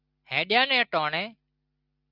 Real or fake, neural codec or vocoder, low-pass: real; none; 5.4 kHz